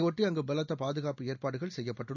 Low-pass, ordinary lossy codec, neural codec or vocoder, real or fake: 7.2 kHz; none; none; real